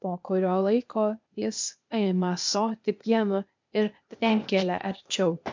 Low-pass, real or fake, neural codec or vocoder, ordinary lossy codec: 7.2 kHz; fake; codec, 16 kHz, 0.8 kbps, ZipCodec; MP3, 64 kbps